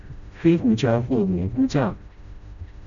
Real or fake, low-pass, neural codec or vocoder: fake; 7.2 kHz; codec, 16 kHz, 0.5 kbps, FreqCodec, smaller model